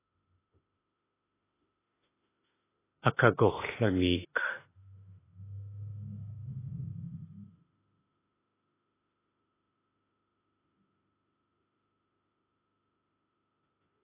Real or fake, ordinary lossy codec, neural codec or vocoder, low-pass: fake; AAC, 16 kbps; autoencoder, 48 kHz, 32 numbers a frame, DAC-VAE, trained on Japanese speech; 3.6 kHz